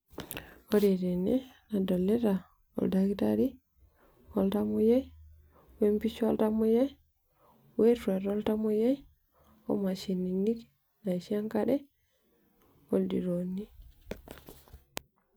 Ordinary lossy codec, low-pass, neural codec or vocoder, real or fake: none; none; none; real